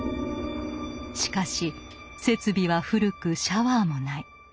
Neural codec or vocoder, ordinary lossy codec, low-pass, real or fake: none; none; none; real